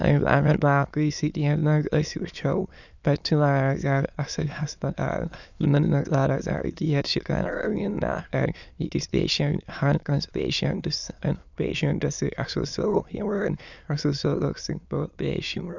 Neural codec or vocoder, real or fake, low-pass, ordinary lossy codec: autoencoder, 22.05 kHz, a latent of 192 numbers a frame, VITS, trained on many speakers; fake; 7.2 kHz; none